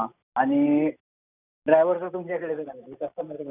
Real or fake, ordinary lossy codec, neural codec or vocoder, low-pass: real; none; none; 3.6 kHz